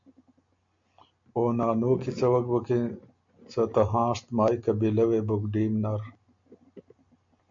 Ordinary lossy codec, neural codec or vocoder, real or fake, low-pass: MP3, 48 kbps; none; real; 7.2 kHz